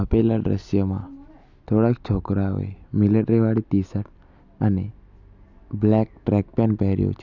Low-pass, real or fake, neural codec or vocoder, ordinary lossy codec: 7.2 kHz; real; none; none